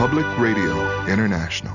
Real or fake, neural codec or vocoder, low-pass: real; none; 7.2 kHz